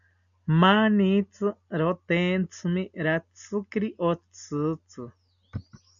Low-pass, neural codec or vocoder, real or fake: 7.2 kHz; none; real